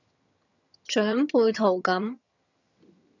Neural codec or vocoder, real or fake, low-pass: vocoder, 22.05 kHz, 80 mel bands, HiFi-GAN; fake; 7.2 kHz